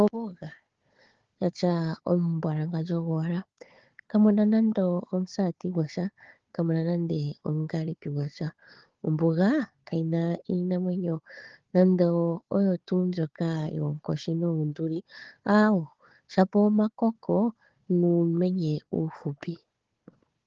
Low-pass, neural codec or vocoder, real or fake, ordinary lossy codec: 7.2 kHz; codec, 16 kHz, 4 kbps, FunCodec, trained on Chinese and English, 50 frames a second; fake; Opus, 16 kbps